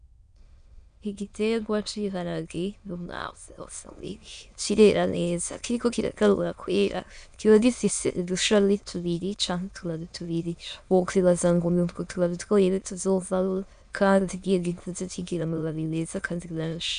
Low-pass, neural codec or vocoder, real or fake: 9.9 kHz; autoencoder, 22.05 kHz, a latent of 192 numbers a frame, VITS, trained on many speakers; fake